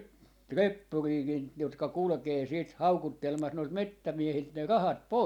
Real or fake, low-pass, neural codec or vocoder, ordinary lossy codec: real; 19.8 kHz; none; none